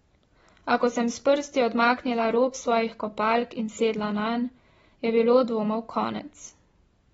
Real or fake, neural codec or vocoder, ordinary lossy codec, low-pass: real; none; AAC, 24 kbps; 10.8 kHz